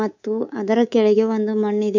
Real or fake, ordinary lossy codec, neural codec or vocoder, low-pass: fake; none; codec, 24 kHz, 3.1 kbps, DualCodec; 7.2 kHz